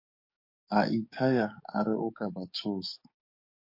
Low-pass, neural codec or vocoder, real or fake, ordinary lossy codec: 5.4 kHz; codec, 44.1 kHz, 7.8 kbps, DAC; fake; MP3, 32 kbps